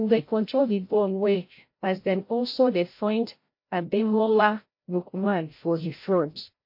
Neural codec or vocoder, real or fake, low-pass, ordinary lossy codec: codec, 16 kHz, 0.5 kbps, FreqCodec, larger model; fake; 5.4 kHz; MP3, 32 kbps